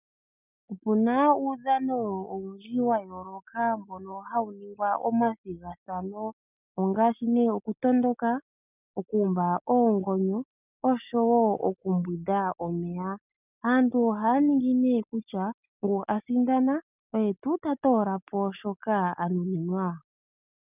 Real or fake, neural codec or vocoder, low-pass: real; none; 3.6 kHz